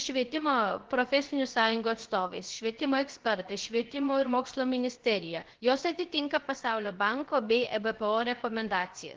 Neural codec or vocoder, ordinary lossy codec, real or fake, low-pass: codec, 16 kHz, about 1 kbps, DyCAST, with the encoder's durations; Opus, 16 kbps; fake; 7.2 kHz